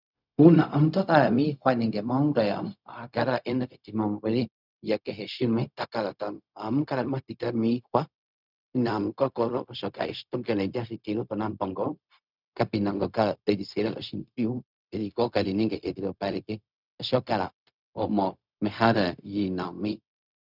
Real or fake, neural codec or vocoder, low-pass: fake; codec, 16 kHz, 0.4 kbps, LongCat-Audio-Codec; 5.4 kHz